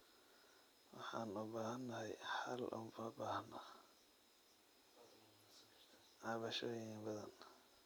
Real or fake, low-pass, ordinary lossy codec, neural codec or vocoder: real; none; none; none